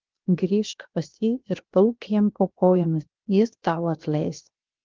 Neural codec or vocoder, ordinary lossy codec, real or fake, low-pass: codec, 16 kHz, 0.7 kbps, FocalCodec; Opus, 32 kbps; fake; 7.2 kHz